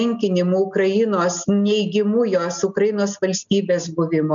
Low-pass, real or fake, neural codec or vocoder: 7.2 kHz; real; none